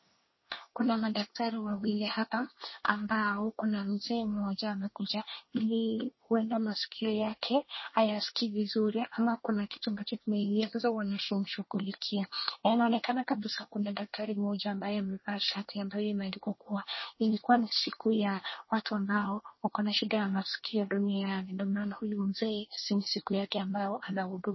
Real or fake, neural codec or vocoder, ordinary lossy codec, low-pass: fake; codec, 24 kHz, 1 kbps, SNAC; MP3, 24 kbps; 7.2 kHz